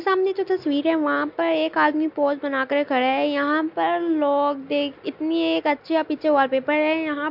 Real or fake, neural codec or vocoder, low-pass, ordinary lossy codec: real; none; 5.4 kHz; MP3, 48 kbps